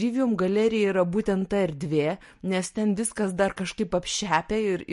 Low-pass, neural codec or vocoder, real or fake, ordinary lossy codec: 14.4 kHz; none; real; MP3, 48 kbps